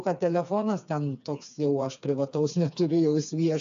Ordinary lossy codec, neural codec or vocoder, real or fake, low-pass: AAC, 96 kbps; codec, 16 kHz, 4 kbps, FreqCodec, smaller model; fake; 7.2 kHz